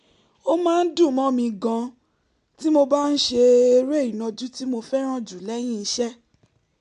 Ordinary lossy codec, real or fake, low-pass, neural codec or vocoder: MP3, 64 kbps; real; 10.8 kHz; none